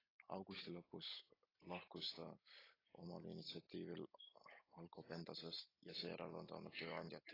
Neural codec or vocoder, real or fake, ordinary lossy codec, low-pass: none; real; AAC, 24 kbps; 5.4 kHz